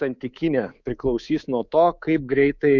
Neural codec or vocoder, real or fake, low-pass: codec, 24 kHz, 3.1 kbps, DualCodec; fake; 7.2 kHz